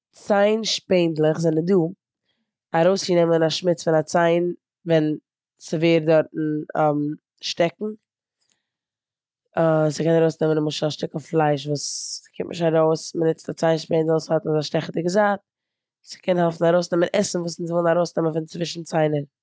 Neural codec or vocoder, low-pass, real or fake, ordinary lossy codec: none; none; real; none